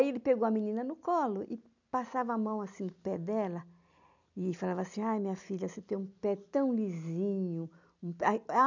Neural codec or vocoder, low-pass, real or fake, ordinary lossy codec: none; 7.2 kHz; real; none